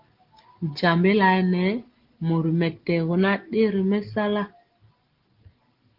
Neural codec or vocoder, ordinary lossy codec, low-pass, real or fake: none; Opus, 16 kbps; 5.4 kHz; real